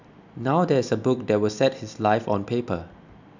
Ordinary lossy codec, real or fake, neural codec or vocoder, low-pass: none; real; none; 7.2 kHz